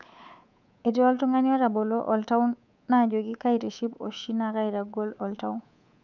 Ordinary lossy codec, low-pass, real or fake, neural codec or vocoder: none; 7.2 kHz; fake; codec, 24 kHz, 3.1 kbps, DualCodec